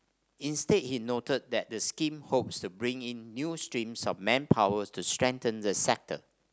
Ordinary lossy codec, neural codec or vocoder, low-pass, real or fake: none; none; none; real